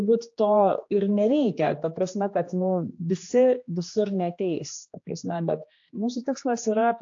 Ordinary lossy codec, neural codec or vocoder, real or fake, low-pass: AAC, 48 kbps; codec, 16 kHz, 2 kbps, X-Codec, HuBERT features, trained on general audio; fake; 7.2 kHz